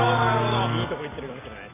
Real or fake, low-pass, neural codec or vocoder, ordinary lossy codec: real; 3.6 kHz; none; AAC, 16 kbps